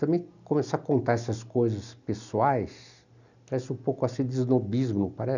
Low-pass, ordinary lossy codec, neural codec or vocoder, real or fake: 7.2 kHz; none; none; real